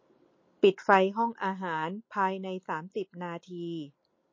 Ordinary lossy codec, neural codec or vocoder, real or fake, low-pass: MP3, 32 kbps; none; real; 7.2 kHz